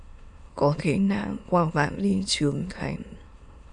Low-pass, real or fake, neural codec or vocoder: 9.9 kHz; fake; autoencoder, 22.05 kHz, a latent of 192 numbers a frame, VITS, trained on many speakers